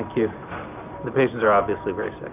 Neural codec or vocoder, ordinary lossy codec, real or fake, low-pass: none; MP3, 32 kbps; real; 3.6 kHz